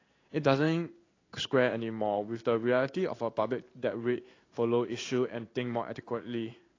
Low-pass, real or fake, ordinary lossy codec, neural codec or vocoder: 7.2 kHz; fake; AAC, 32 kbps; codec, 16 kHz in and 24 kHz out, 1 kbps, XY-Tokenizer